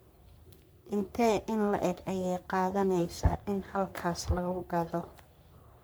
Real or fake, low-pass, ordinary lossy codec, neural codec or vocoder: fake; none; none; codec, 44.1 kHz, 3.4 kbps, Pupu-Codec